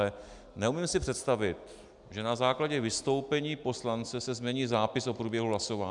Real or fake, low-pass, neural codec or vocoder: real; 10.8 kHz; none